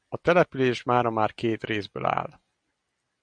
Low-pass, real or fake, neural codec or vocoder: 9.9 kHz; real; none